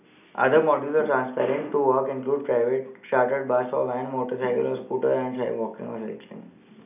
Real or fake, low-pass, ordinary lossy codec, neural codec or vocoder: real; 3.6 kHz; none; none